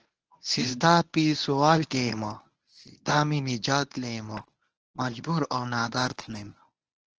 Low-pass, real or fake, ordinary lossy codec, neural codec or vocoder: 7.2 kHz; fake; Opus, 16 kbps; codec, 24 kHz, 0.9 kbps, WavTokenizer, medium speech release version 1